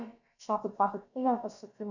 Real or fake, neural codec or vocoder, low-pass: fake; codec, 16 kHz, about 1 kbps, DyCAST, with the encoder's durations; 7.2 kHz